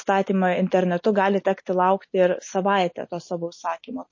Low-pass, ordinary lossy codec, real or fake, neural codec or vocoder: 7.2 kHz; MP3, 32 kbps; real; none